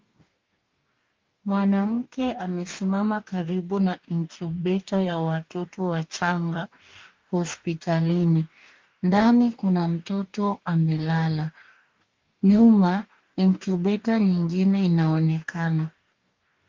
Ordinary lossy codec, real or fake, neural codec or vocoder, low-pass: Opus, 24 kbps; fake; codec, 44.1 kHz, 2.6 kbps, DAC; 7.2 kHz